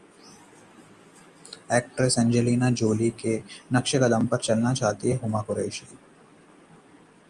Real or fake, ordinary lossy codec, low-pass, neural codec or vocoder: real; Opus, 32 kbps; 10.8 kHz; none